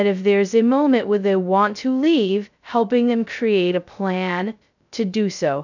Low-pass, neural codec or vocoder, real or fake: 7.2 kHz; codec, 16 kHz, 0.2 kbps, FocalCodec; fake